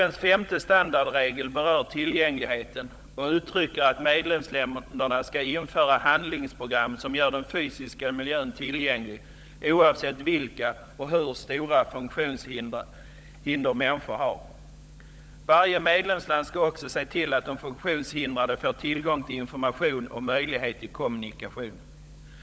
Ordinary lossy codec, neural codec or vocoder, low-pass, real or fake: none; codec, 16 kHz, 16 kbps, FunCodec, trained on LibriTTS, 50 frames a second; none; fake